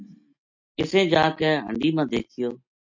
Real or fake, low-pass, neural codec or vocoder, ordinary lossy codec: real; 7.2 kHz; none; MP3, 48 kbps